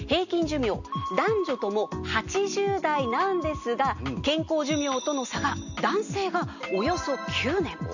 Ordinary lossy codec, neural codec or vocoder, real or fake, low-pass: none; none; real; 7.2 kHz